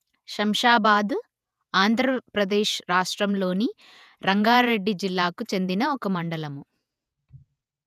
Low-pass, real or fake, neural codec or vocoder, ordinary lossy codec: 14.4 kHz; fake; vocoder, 48 kHz, 128 mel bands, Vocos; none